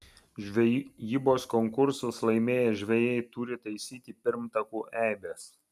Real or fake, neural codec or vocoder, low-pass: real; none; 14.4 kHz